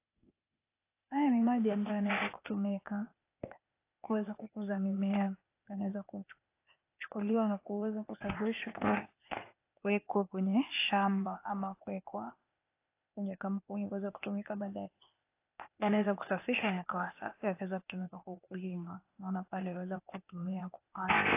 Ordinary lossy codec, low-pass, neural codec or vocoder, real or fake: AAC, 24 kbps; 3.6 kHz; codec, 16 kHz, 0.8 kbps, ZipCodec; fake